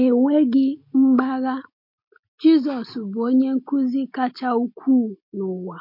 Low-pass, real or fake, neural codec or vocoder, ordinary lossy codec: 5.4 kHz; real; none; MP3, 32 kbps